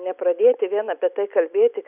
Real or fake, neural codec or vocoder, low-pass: real; none; 3.6 kHz